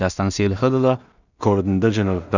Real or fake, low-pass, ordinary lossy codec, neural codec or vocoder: fake; 7.2 kHz; none; codec, 16 kHz in and 24 kHz out, 0.4 kbps, LongCat-Audio-Codec, two codebook decoder